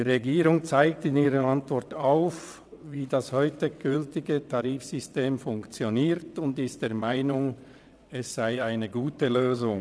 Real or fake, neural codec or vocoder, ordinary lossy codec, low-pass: fake; vocoder, 22.05 kHz, 80 mel bands, WaveNeXt; none; none